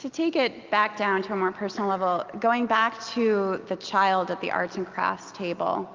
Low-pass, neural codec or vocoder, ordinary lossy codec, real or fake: 7.2 kHz; none; Opus, 32 kbps; real